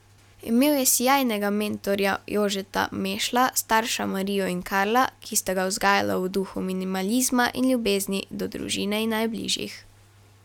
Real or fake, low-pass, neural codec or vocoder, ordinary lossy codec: real; 19.8 kHz; none; none